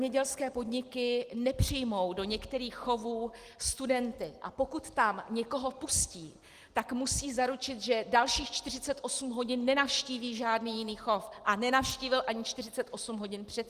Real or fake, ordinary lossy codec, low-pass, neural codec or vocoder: real; Opus, 24 kbps; 14.4 kHz; none